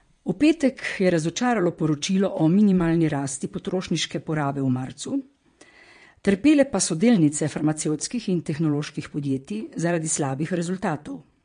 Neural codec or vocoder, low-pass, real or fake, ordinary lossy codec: vocoder, 22.05 kHz, 80 mel bands, Vocos; 9.9 kHz; fake; MP3, 48 kbps